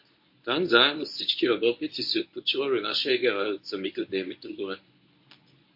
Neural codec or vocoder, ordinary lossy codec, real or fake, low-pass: codec, 24 kHz, 0.9 kbps, WavTokenizer, medium speech release version 1; MP3, 32 kbps; fake; 5.4 kHz